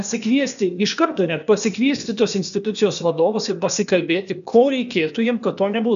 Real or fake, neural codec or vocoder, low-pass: fake; codec, 16 kHz, 0.8 kbps, ZipCodec; 7.2 kHz